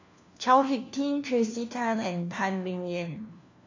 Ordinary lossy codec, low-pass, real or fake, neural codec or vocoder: AAC, 48 kbps; 7.2 kHz; fake; codec, 16 kHz, 1 kbps, FunCodec, trained on LibriTTS, 50 frames a second